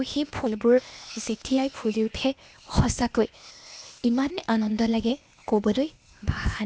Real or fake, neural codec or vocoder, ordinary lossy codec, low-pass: fake; codec, 16 kHz, 0.8 kbps, ZipCodec; none; none